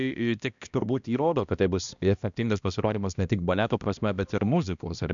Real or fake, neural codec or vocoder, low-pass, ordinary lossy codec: fake; codec, 16 kHz, 1 kbps, X-Codec, HuBERT features, trained on balanced general audio; 7.2 kHz; MP3, 96 kbps